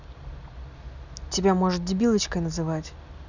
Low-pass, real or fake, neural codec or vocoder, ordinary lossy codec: 7.2 kHz; real; none; none